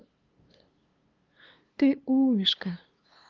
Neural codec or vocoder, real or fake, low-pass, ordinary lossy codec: codec, 16 kHz, 2 kbps, FunCodec, trained on LibriTTS, 25 frames a second; fake; 7.2 kHz; Opus, 24 kbps